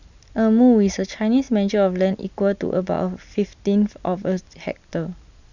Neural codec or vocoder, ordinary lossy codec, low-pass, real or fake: none; none; 7.2 kHz; real